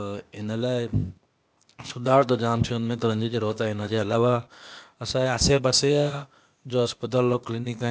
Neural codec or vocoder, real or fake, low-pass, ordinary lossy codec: codec, 16 kHz, 0.8 kbps, ZipCodec; fake; none; none